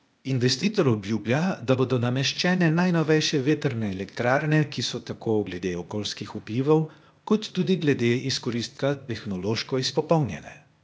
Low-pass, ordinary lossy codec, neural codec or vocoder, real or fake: none; none; codec, 16 kHz, 0.8 kbps, ZipCodec; fake